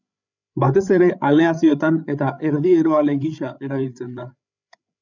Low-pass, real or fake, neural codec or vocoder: 7.2 kHz; fake; codec, 16 kHz, 8 kbps, FreqCodec, larger model